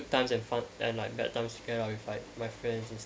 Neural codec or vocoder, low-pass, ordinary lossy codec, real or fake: none; none; none; real